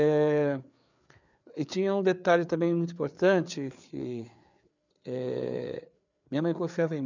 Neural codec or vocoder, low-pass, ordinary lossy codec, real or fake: codec, 16 kHz, 4 kbps, FreqCodec, larger model; 7.2 kHz; none; fake